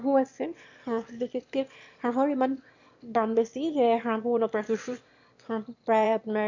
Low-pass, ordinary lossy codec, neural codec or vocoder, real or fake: 7.2 kHz; MP3, 48 kbps; autoencoder, 22.05 kHz, a latent of 192 numbers a frame, VITS, trained on one speaker; fake